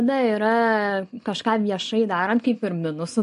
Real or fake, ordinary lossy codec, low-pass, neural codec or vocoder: real; MP3, 48 kbps; 10.8 kHz; none